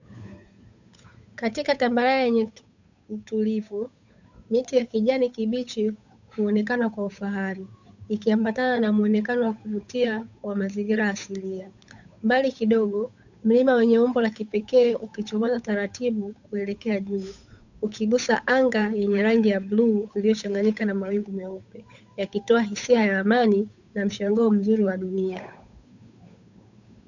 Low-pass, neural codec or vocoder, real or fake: 7.2 kHz; codec, 16 kHz, 8 kbps, FunCodec, trained on Chinese and English, 25 frames a second; fake